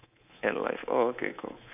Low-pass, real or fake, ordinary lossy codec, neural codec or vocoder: 3.6 kHz; fake; none; codec, 24 kHz, 3.1 kbps, DualCodec